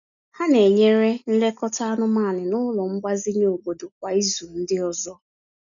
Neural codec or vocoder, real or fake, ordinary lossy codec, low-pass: none; real; none; 7.2 kHz